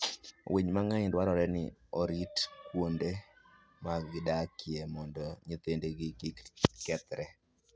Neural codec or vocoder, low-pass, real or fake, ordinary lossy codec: none; none; real; none